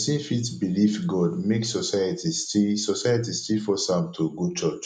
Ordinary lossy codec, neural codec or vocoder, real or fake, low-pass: none; none; real; 10.8 kHz